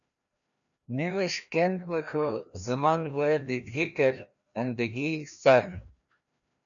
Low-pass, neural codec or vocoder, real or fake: 7.2 kHz; codec, 16 kHz, 1 kbps, FreqCodec, larger model; fake